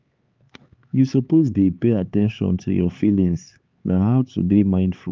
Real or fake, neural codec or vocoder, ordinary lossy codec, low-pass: fake; codec, 16 kHz, 4 kbps, X-Codec, HuBERT features, trained on LibriSpeech; Opus, 32 kbps; 7.2 kHz